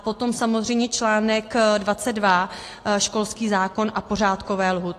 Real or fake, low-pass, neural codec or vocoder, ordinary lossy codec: real; 14.4 kHz; none; AAC, 48 kbps